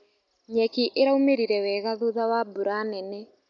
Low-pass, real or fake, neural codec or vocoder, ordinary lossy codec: 7.2 kHz; real; none; none